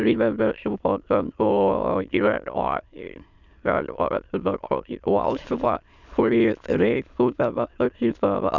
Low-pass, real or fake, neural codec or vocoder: 7.2 kHz; fake; autoencoder, 22.05 kHz, a latent of 192 numbers a frame, VITS, trained on many speakers